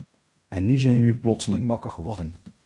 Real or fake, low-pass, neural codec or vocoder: fake; 10.8 kHz; codec, 16 kHz in and 24 kHz out, 0.9 kbps, LongCat-Audio-Codec, fine tuned four codebook decoder